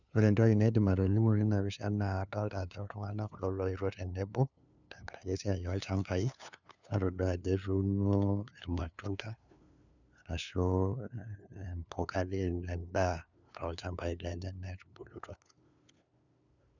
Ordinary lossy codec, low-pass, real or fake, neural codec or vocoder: none; 7.2 kHz; fake; codec, 16 kHz, 2 kbps, FunCodec, trained on LibriTTS, 25 frames a second